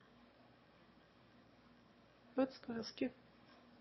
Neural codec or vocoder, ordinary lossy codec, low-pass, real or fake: autoencoder, 22.05 kHz, a latent of 192 numbers a frame, VITS, trained on one speaker; MP3, 24 kbps; 7.2 kHz; fake